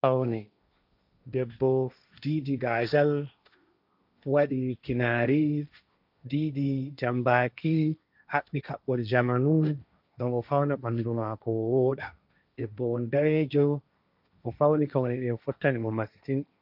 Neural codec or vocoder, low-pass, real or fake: codec, 16 kHz, 1.1 kbps, Voila-Tokenizer; 5.4 kHz; fake